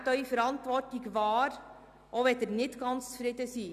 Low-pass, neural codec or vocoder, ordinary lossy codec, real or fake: 14.4 kHz; none; none; real